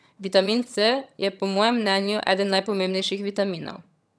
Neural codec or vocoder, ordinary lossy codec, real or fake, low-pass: vocoder, 22.05 kHz, 80 mel bands, HiFi-GAN; none; fake; none